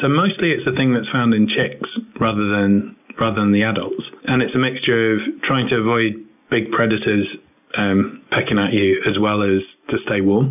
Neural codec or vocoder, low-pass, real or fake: none; 3.6 kHz; real